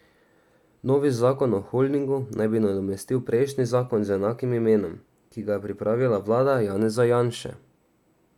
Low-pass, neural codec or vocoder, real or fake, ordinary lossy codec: 19.8 kHz; none; real; none